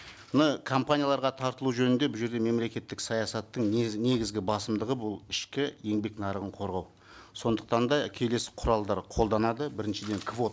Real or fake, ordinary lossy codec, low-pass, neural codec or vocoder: real; none; none; none